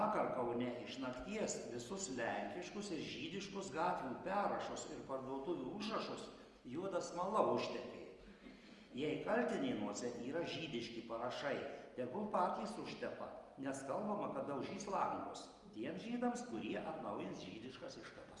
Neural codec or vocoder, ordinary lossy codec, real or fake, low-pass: none; Opus, 32 kbps; real; 10.8 kHz